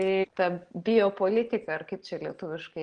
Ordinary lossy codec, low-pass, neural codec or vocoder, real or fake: Opus, 16 kbps; 9.9 kHz; none; real